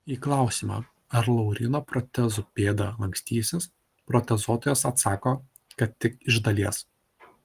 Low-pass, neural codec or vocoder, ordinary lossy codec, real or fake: 14.4 kHz; none; Opus, 24 kbps; real